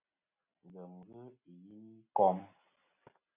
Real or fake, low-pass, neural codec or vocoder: real; 3.6 kHz; none